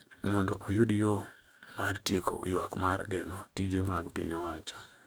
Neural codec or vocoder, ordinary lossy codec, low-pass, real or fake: codec, 44.1 kHz, 2.6 kbps, DAC; none; none; fake